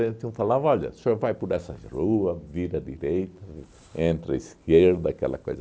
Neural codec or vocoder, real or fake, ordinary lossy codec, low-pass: none; real; none; none